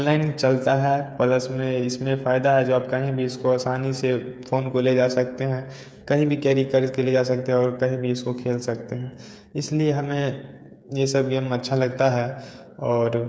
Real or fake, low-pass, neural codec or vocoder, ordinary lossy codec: fake; none; codec, 16 kHz, 8 kbps, FreqCodec, smaller model; none